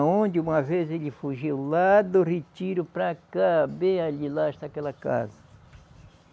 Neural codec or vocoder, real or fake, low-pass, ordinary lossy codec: none; real; none; none